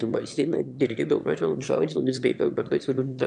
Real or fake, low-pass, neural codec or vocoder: fake; 9.9 kHz; autoencoder, 22.05 kHz, a latent of 192 numbers a frame, VITS, trained on one speaker